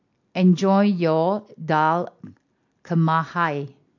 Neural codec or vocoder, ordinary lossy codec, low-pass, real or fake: none; MP3, 48 kbps; 7.2 kHz; real